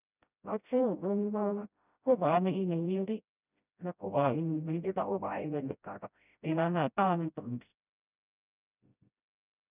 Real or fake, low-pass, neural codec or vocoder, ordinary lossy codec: fake; 3.6 kHz; codec, 16 kHz, 0.5 kbps, FreqCodec, smaller model; none